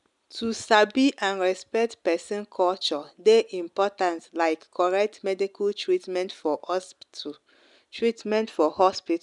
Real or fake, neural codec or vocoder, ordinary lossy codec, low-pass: real; none; none; 10.8 kHz